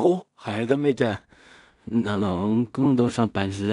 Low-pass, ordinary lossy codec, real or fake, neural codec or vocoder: 10.8 kHz; none; fake; codec, 16 kHz in and 24 kHz out, 0.4 kbps, LongCat-Audio-Codec, two codebook decoder